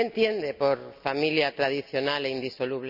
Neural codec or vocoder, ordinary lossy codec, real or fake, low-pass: none; none; real; 5.4 kHz